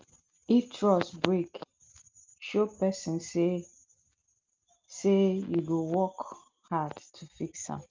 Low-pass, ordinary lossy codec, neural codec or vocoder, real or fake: 7.2 kHz; Opus, 24 kbps; none; real